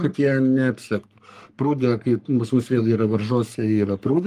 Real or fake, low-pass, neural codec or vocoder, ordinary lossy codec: fake; 14.4 kHz; codec, 44.1 kHz, 3.4 kbps, Pupu-Codec; Opus, 32 kbps